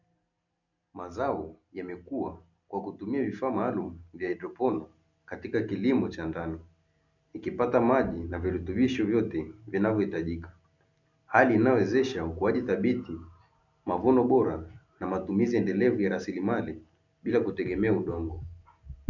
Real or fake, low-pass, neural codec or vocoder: real; 7.2 kHz; none